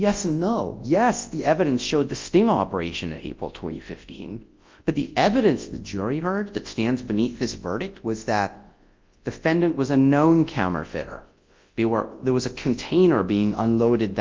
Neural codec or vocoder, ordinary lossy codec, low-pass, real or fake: codec, 24 kHz, 0.9 kbps, WavTokenizer, large speech release; Opus, 24 kbps; 7.2 kHz; fake